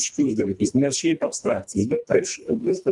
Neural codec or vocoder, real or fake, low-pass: codec, 24 kHz, 1.5 kbps, HILCodec; fake; 10.8 kHz